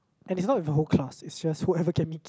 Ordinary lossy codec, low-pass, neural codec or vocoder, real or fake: none; none; none; real